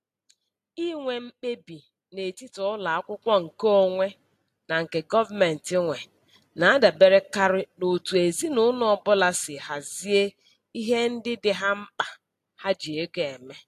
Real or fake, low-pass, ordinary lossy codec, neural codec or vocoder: real; 14.4 kHz; AAC, 64 kbps; none